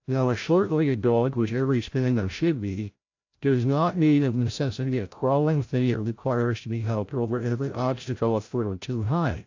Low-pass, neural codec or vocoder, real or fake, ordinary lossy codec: 7.2 kHz; codec, 16 kHz, 0.5 kbps, FreqCodec, larger model; fake; AAC, 48 kbps